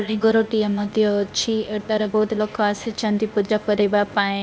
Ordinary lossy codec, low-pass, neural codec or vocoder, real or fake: none; none; codec, 16 kHz, 0.8 kbps, ZipCodec; fake